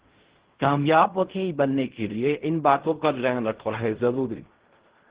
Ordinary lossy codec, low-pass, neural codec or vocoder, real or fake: Opus, 16 kbps; 3.6 kHz; codec, 16 kHz in and 24 kHz out, 0.4 kbps, LongCat-Audio-Codec, fine tuned four codebook decoder; fake